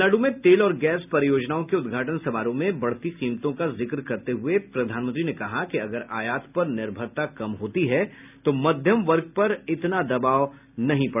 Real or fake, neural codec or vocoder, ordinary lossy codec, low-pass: real; none; none; 3.6 kHz